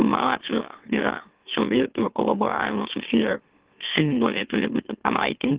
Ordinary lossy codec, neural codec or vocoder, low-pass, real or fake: Opus, 16 kbps; autoencoder, 44.1 kHz, a latent of 192 numbers a frame, MeloTTS; 3.6 kHz; fake